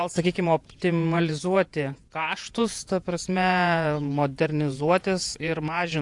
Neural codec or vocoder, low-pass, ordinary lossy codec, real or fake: vocoder, 48 kHz, 128 mel bands, Vocos; 10.8 kHz; AAC, 64 kbps; fake